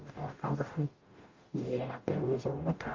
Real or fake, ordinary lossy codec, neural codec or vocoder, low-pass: fake; Opus, 24 kbps; codec, 44.1 kHz, 0.9 kbps, DAC; 7.2 kHz